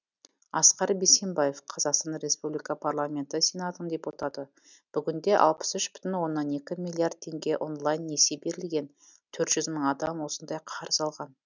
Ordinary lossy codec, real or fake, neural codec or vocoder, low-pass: none; real; none; 7.2 kHz